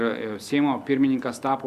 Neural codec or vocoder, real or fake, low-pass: none; real; 14.4 kHz